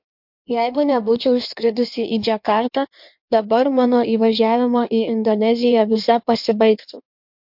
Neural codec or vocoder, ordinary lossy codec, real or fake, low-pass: codec, 16 kHz in and 24 kHz out, 1.1 kbps, FireRedTTS-2 codec; MP3, 48 kbps; fake; 5.4 kHz